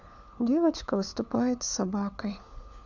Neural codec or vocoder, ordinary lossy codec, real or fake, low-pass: codec, 16 kHz, 4 kbps, FunCodec, trained on LibriTTS, 50 frames a second; none; fake; 7.2 kHz